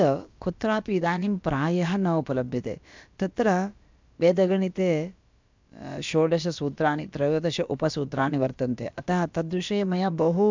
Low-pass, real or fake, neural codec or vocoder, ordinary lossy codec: 7.2 kHz; fake; codec, 16 kHz, about 1 kbps, DyCAST, with the encoder's durations; MP3, 64 kbps